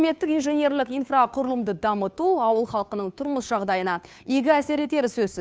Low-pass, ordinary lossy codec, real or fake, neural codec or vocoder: none; none; fake; codec, 16 kHz, 2 kbps, FunCodec, trained on Chinese and English, 25 frames a second